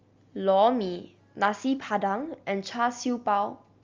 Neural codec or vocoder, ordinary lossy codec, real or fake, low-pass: none; Opus, 32 kbps; real; 7.2 kHz